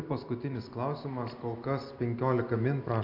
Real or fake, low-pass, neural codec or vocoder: real; 5.4 kHz; none